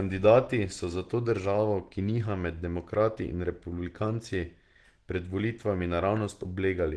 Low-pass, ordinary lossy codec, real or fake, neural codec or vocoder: 10.8 kHz; Opus, 16 kbps; real; none